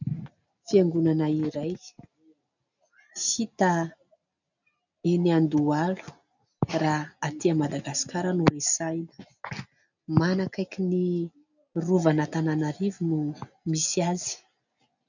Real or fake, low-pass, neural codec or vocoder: real; 7.2 kHz; none